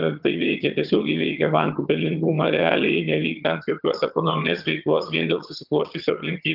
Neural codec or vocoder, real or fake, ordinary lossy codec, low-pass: vocoder, 22.05 kHz, 80 mel bands, HiFi-GAN; fake; Opus, 32 kbps; 5.4 kHz